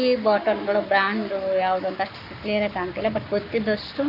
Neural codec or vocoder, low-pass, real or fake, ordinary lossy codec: codec, 44.1 kHz, 7.8 kbps, Pupu-Codec; 5.4 kHz; fake; none